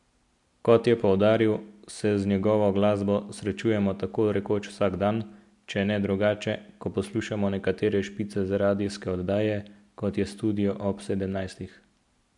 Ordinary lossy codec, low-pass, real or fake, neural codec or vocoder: MP3, 64 kbps; 10.8 kHz; real; none